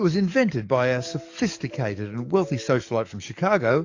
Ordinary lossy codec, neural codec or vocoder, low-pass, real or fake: AAC, 48 kbps; codec, 44.1 kHz, 7.8 kbps, DAC; 7.2 kHz; fake